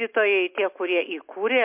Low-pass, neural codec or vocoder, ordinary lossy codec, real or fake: 3.6 kHz; none; MP3, 32 kbps; real